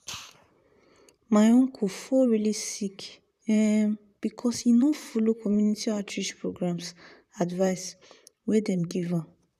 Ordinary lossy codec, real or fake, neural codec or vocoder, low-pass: none; fake; vocoder, 44.1 kHz, 128 mel bands, Pupu-Vocoder; 14.4 kHz